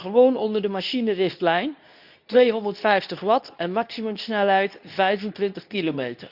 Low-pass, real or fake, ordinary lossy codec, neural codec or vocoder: 5.4 kHz; fake; none; codec, 24 kHz, 0.9 kbps, WavTokenizer, medium speech release version 2